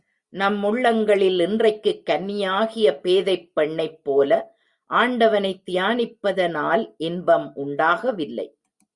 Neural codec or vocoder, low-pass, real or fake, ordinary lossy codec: none; 10.8 kHz; real; Opus, 64 kbps